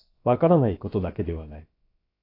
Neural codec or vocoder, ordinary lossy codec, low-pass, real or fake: codec, 16 kHz, about 1 kbps, DyCAST, with the encoder's durations; AAC, 32 kbps; 5.4 kHz; fake